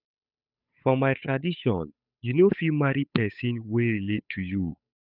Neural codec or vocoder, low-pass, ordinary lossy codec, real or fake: codec, 16 kHz, 8 kbps, FunCodec, trained on Chinese and English, 25 frames a second; 5.4 kHz; none; fake